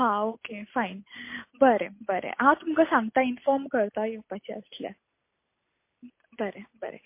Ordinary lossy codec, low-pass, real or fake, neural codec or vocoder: MP3, 24 kbps; 3.6 kHz; real; none